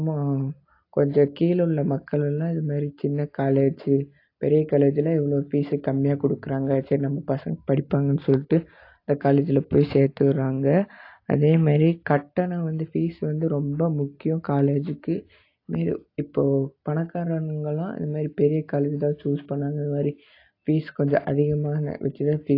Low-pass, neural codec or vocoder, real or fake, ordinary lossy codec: 5.4 kHz; none; real; AAC, 32 kbps